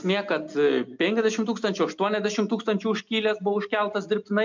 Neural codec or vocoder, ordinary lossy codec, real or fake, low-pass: none; MP3, 64 kbps; real; 7.2 kHz